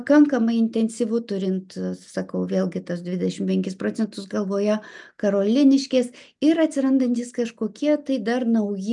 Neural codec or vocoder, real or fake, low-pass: none; real; 10.8 kHz